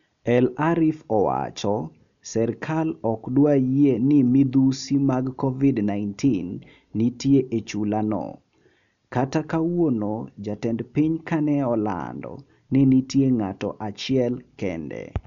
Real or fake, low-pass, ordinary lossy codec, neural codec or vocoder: real; 7.2 kHz; none; none